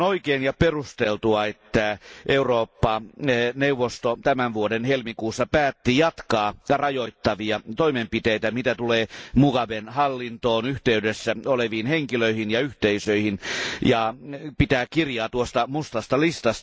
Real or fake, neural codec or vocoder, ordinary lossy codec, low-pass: real; none; none; none